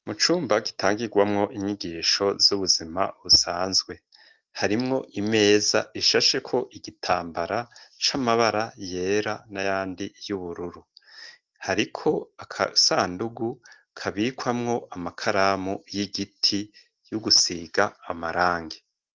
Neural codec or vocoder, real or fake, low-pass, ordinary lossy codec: none; real; 7.2 kHz; Opus, 24 kbps